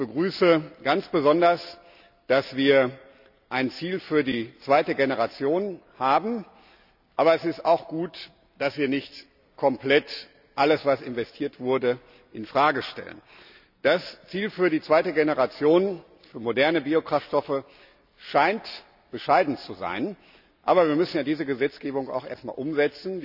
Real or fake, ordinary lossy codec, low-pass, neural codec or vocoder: real; none; 5.4 kHz; none